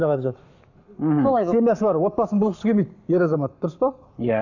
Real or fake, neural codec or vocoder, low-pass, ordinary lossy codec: fake; codec, 44.1 kHz, 7.8 kbps, Pupu-Codec; 7.2 kHz; none